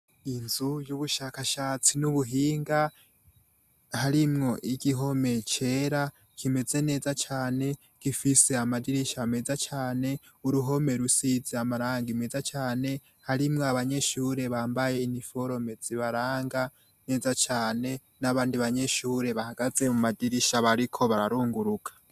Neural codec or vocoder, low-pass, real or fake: none; 14.4 kHz; real